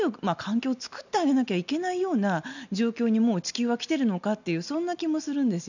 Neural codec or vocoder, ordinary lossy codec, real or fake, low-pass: none; none; real; 7.2 kHz